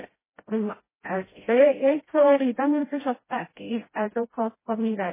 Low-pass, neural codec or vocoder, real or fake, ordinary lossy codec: 3.6 kHz; codec, 16 kHz, 0.5 kbps, FreqCodec, smaller model; fake; MP3, 16 kbps